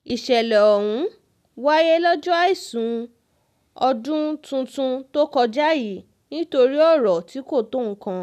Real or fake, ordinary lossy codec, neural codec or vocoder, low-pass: real; none; none; 14.4 kHz